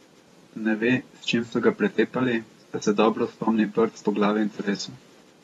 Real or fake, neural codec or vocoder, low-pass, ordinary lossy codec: fake; vocoder, 44.1 kHz, 128 mel bands every 512 samples, BigVGAN v2; 19.8 kHz; AAC, 32 kbps